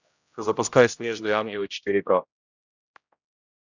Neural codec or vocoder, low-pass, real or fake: codec, 16 kHz, 0.5 kbps, X-Codec, HuBERT features, trained on general audio; 7.2 kHz; fake